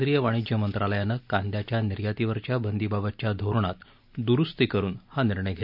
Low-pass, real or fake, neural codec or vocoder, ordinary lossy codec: 5.4 kHz; real; none; none